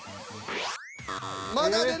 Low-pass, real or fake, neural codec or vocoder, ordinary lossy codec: none; real; none; none